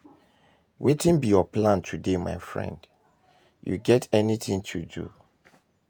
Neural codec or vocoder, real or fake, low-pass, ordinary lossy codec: vocoder, 48 kHz, 128 mel bands, Vocos; fake; none; none